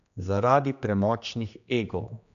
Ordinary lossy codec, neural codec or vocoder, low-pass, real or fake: none; codec, 16 kHz, 2 kbps, X-Codec, HuBERT features, trained on general audio; 7.2 kHz; fake